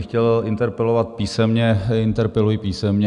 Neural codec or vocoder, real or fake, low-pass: none; real; 10.8 kHz